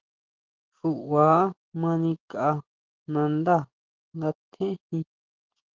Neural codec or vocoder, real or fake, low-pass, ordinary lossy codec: none; real; 7.2 kHz; Opus, 16 kbps